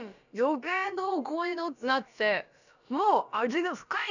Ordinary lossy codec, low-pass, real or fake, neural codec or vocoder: none; 7.2 kHz; fake; codec, 16 kHz, about 1 kbps, DyCAST, with the encoder's durations